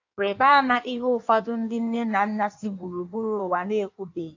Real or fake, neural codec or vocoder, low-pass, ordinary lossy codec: fake; codec, 16 kHz in and 24 kHz out, 1.1 kbps, FireRedTTS-2 codec; 7.2 kHz; AAC, 48 kbps